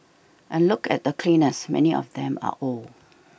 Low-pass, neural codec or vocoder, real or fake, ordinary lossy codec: none; none; real; none